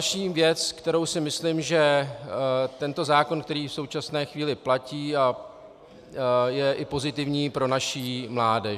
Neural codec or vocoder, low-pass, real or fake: none; 14.4 kHz; real